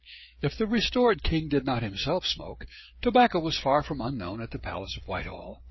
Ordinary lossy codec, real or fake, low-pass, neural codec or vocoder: MP3, 24 kbps; fake; 7.2 kHz; codec, 16 kHz, 16 kbps, FreqCodec, smaller model